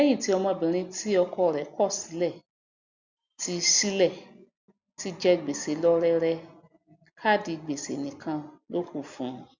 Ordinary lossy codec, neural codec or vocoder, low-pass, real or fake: Opus, 64 kbps; none; 7.2 kHz; real